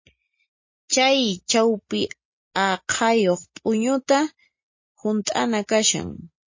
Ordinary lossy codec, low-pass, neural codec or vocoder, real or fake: MP3, 32 kbps; 7.2 kHz; none; real